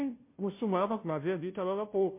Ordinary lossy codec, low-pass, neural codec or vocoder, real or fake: MP3, 24 kbps; 3.6 kHz; codec, 16 kHz, 0.5 kbps, FunCodec, trained on Chinese and English, 25 frames a second; fake